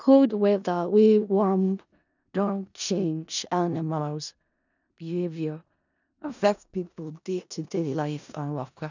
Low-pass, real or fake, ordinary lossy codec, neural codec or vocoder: 7.2 kHz; fake; none; codec, 16 kHz in and 24 kHz out, 0.4 kbps, LongCat-Audio-Codec, four codebook decoder